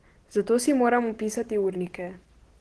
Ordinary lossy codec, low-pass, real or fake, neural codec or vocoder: Opus, 16 kbps; 10.8 kHz; real; none